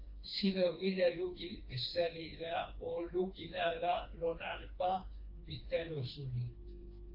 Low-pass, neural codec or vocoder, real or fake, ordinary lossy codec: 5.4 kHz; codec, 16 kHz, 2 kbps, FreqCodec, smaller model; fake; AAC, 32 kbps